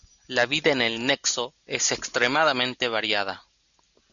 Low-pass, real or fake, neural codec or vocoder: 7.2 kHz; real; none